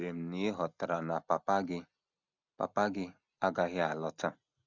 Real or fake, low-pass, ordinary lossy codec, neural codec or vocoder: real; 7.2 kHz; none; none